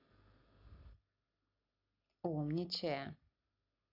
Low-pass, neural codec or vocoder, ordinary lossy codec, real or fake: 5.4 kHz; none; AAC, 48 kbps; real